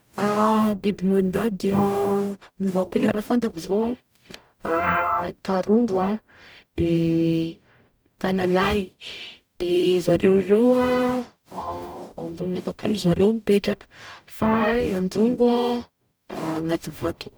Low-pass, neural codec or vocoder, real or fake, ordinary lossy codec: none; codec, 44.1 kHz, 0.9 kbps, DAC; fake; none